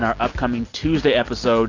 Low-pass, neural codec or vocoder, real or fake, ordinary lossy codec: 7.2 kHz; none; real; AAC, 48 kbps